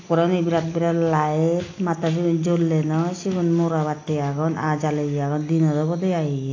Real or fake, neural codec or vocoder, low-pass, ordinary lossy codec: real; none; 7.2 kHz; AAC, 32 kbps